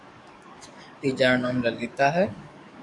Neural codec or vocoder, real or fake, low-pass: codec, 44.1 kHz, 7.8 kbps, DAC; fake; 10.8 kHz